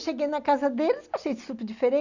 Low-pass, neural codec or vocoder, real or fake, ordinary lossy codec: 7.2 kHz; none; real; none